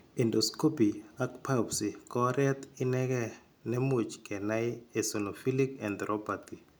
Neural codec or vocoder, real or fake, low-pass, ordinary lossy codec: none; real; none; none